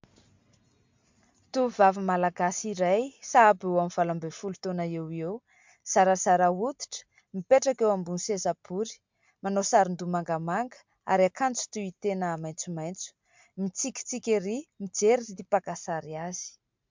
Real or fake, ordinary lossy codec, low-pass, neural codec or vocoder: real; MP3, 64 kbps; 7.2 kHz; none